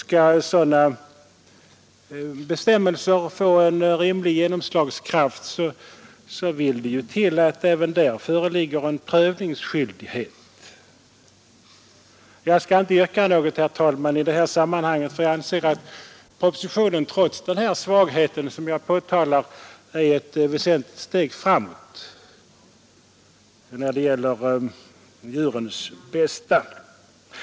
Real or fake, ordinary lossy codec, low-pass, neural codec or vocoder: real; none; none; none